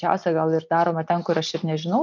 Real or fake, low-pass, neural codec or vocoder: real; 7.2 kHz; none